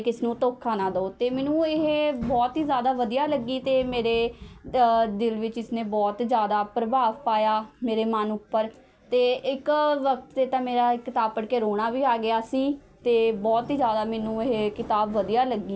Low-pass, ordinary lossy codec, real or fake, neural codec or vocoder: none; none; real; none